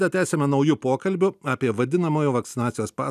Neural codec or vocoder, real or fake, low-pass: none; real; 14.4 kHz